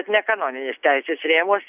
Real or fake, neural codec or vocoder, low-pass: real; none; 3.6 kHz